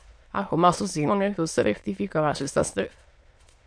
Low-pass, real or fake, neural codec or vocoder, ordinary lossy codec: 9.9 kHz; fake; autoencoder, 22.05 kHz, a latent of 192 numbers a frame, VITS, trained on many speakers; MP3, 64 kbps